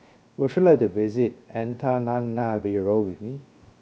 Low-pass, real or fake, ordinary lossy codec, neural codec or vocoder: none; fake; none; codec, 16 kHz, 0.7 kbps, FocalCodec